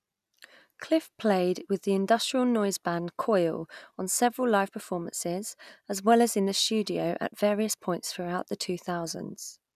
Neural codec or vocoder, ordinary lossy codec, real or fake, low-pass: none; none; real; 14.4 kHz